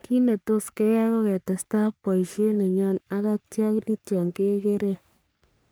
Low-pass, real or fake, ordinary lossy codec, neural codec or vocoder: none; fake; none; codec, 44.1 kHz, 3.4 kbps, Pupu-Codec